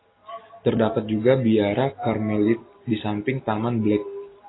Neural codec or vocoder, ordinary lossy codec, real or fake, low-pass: none; AAC, 16 kbps; real; 7.2 kHz